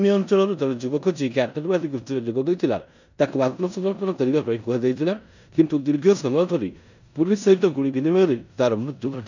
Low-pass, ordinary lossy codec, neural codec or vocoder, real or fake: 7.2 kHz; none; codec, 16 kHz in and 24 kHz out, 0.9 kbps, LongCat-Audio-Codec, four codebook decoder; fake